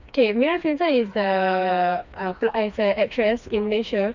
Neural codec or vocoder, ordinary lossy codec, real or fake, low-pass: codec, 16 kHz, 2 kbps, FreqCodec, smaller model; none; fake; 7.2 kHz